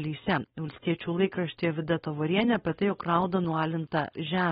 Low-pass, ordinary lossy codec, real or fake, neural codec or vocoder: 7.2 kHz; AAC, 16 kbps; fake; codec, 16 kHz, 4.8 kbps, FACodec